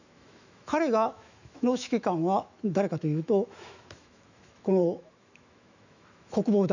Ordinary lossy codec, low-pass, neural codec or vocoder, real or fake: none; 7.2 kHz; autoencoder, 48 kHz, 128 numbers a frame, DAC-VAE, trained on Japanese speech; fake